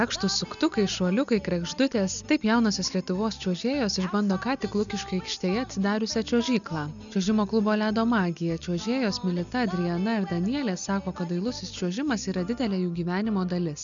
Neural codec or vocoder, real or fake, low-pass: none; real; 7.2 kHz